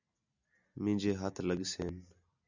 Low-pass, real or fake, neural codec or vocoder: 7.2 kHz; real; none